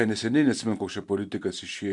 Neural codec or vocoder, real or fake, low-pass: none; real; 10.8 kHz